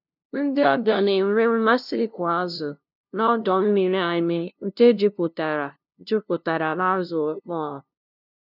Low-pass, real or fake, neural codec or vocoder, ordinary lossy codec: 5.4 kHz; fake; codec, 16 kHz, 0.5 kbps, FunCodec, trained on LibriTTS, 25 frames a second; none